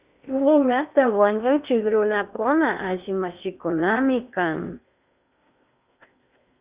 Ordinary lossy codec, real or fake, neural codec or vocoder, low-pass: Opus, 64 kbps; fake; codec, 16 kHz in and 24 kHz out, 0.8 kbps, FocalCodec, streaming, 65536 codes; 3.6 kHz